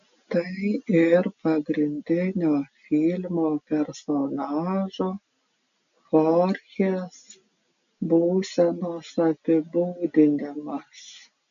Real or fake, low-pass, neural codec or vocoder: real; 7.2 kHz; none